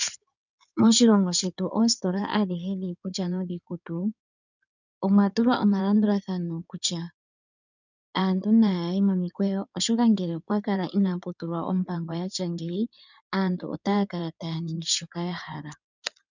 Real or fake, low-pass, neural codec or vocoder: fake; 7.2 kHz; codec, 16 kHz in and 24 kHz out, 2.2 kbps, FireRedTTS-2 codec